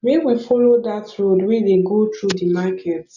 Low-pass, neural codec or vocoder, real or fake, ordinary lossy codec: 7.2 kHz; none; real; none